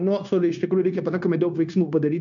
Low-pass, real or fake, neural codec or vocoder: 7.2 kHz; fake; codec, 16 kHz, 0.9 kbps, LongCat-Audio-Codec